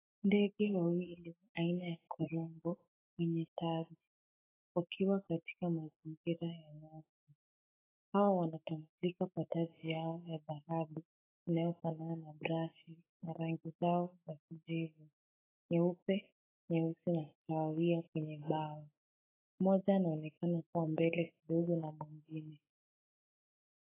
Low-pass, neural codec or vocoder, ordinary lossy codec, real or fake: 3.6 kHz; autoencoder, 48 kHz, 128 numbers a frame, DAC-VAE, trained on Japanese speech; AAC, 16 kbps; fake